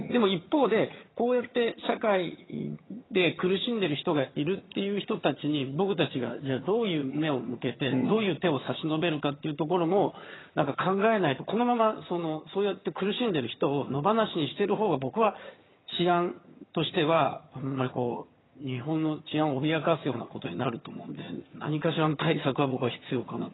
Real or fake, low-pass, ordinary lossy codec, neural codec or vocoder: fake; 7.2 kHz; AAC, 16 kbps; vocoder, 22.05 kHz, 80 mel bands, HiFi-GAN